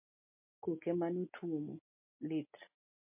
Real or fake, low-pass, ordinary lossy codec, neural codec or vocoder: real; 3.6 kHz; MP3, 32 kbps; none